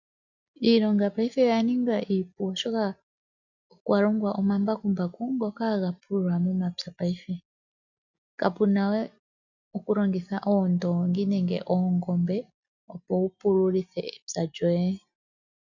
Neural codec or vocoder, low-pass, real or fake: none; 7.2 kHz; real